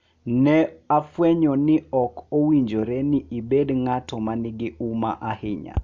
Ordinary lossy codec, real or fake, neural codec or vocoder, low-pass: MP3, 64 kbps; real; none; 7.2 kHz